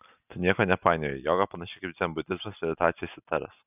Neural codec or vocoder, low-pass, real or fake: none; 3.6 kHz; real